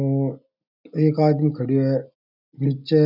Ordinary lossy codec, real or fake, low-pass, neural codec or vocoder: none; real; 5.4 kHz; none